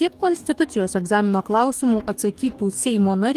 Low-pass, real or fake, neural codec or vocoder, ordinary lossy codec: 14.4 kHz; fake; codec, 44.1 kHz, 2.6 kbps, DAC; Opus, 32 kbps